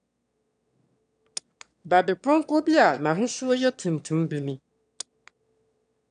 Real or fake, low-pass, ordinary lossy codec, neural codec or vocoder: fake; 9.9 kHz; none; autoencoder, 22.05 kHz, a latent of 192 numbers a frame, VITS, trained on one speaker